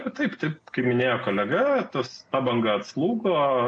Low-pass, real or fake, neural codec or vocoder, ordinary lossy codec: 9.9 kHz; real; none; MP3, 48 kbps